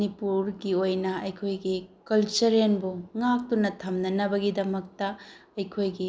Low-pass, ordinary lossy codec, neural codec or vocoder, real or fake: none; none; none; real